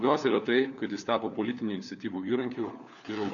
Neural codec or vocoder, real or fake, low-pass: codec, 16 kHz, 4 kbps, FunCodec, trained on LibriTTS, 50 frames a second; fake; 7.2 kHz